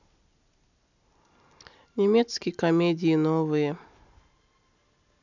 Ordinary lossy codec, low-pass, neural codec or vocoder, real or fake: none; 7.2 kHz; none; real